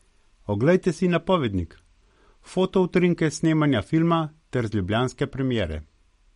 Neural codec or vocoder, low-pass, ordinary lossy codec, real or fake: none; 10.8 kHz; MP3, 48 kbps; real